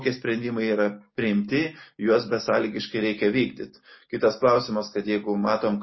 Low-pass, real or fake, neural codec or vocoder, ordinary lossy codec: 7.2 kHz; real; none; MP3, 24 kbps